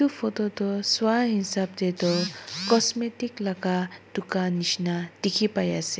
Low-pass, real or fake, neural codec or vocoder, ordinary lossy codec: none; real; none; none